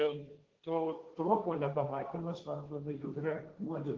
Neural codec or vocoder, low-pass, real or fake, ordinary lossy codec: codec, 16 kHz, 1.1 kbps, Voila-Tokenizer; 7.2 kHz; fake; Opus, 16 kbps